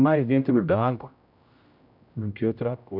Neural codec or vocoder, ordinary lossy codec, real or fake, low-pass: codec, 16 kHz, 0.5 kbps, X-Codec, HuBERT features, trained on general audio; none; fake; 5.4 kHz